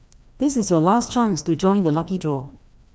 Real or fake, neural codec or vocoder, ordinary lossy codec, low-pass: fake; codec, 16 kHz, 1 kbps, FreqCodec, larger model; none; none